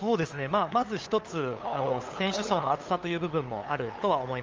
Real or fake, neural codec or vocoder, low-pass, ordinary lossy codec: fake; codec, 16 kHz, 8 kbps, FunCodec, trained on LibriTTS, 25 frames a second; 7.2 kHz; Opus, 24 kbps